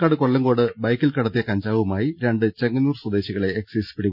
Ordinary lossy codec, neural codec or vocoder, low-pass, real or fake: none; none; 5.4 kHz; real